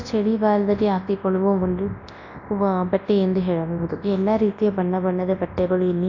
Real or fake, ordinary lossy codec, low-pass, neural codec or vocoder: fake; AAC, 48 kbps; 7.2 kHz; codec, 24 kHz, 0.9 kbps, WavTokenizer, large speech release